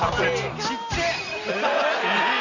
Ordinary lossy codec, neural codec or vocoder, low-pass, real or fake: none; none; 7.2 kHz; real